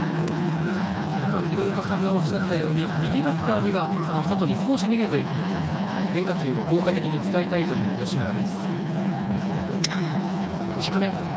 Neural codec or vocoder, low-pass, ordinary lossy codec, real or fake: codec, 16 kHz, 2 kbps, FreqCodec, smaller model; none; none; fake